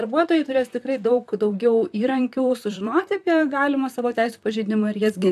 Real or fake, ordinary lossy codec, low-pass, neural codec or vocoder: fake; AAC, 96 kbps; 14.4 kHz; vocoder, 44.1 kHz, 128 mel bands, Pupu-Vocoder